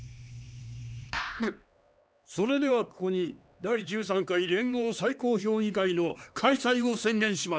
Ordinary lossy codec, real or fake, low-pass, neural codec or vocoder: none; fake; none; codec, 16 kHz, 2 kbps, X-Codec, HuBERT features, trained on LibriSpeech